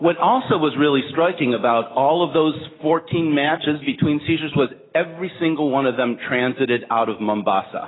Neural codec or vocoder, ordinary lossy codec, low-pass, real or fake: none; AAC, 16 kbps; 7.2 kHz; real